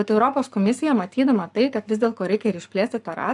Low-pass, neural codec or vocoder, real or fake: 10.8 kHz; codec, 44.1 kHz, 7.8 kbps, Pupu-Codec; fake